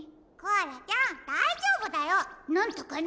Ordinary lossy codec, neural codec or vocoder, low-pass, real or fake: none; none; none; real